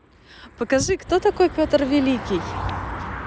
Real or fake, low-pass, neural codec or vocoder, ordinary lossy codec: real; none; none; none